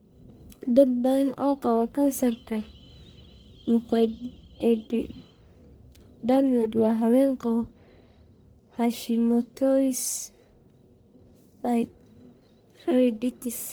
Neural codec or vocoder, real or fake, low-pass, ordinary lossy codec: codec, 44.1 kHz, 1.7 kbps, Pupu-Codec; fake; none; none